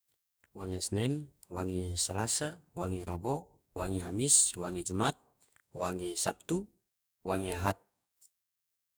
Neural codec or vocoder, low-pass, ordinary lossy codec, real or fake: codec, 44.1 kHz, 2.6 kbps, DAC; none; none; fake